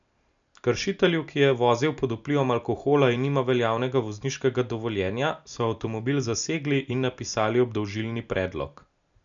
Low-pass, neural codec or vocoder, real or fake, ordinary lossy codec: 7.2 kHz; none; real; none